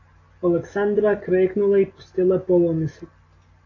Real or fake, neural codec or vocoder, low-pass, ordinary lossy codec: real; none; 7.2 kHz; AAC, 32 kbps